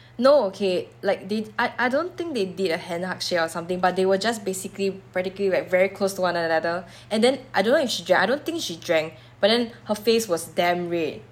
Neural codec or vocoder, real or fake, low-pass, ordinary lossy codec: none; real; 19.8 kHz; none